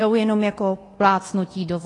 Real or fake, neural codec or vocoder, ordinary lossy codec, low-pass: fake; codec, 24 kHz, 0.9 kbps, DualCodec; AAC, 32 kbps; 10.8 kHz